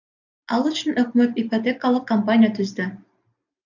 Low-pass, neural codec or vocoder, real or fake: 7.2 kHz; none; real